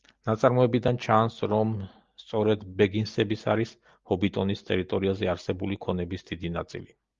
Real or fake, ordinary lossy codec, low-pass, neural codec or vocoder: real; Opus, 32 kbps; 7.2 kHz; none